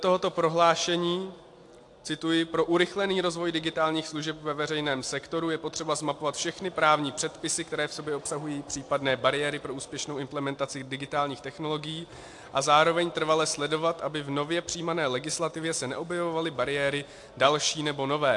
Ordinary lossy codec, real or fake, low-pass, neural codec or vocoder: AAC, 64 kbps; real; 10.8 kHz; none